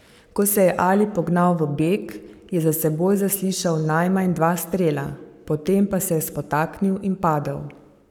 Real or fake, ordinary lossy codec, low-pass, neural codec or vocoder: fake; none; 19.8 kHz; codec, 44.1 kHz, 7.8 kbps, Pupu-Codec